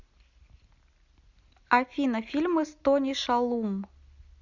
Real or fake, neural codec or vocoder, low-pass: real; none; 7.2 kHz